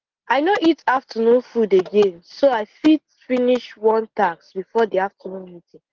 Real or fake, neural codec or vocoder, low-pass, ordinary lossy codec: real; none; 7.2 kHz; Opus, 16 kbps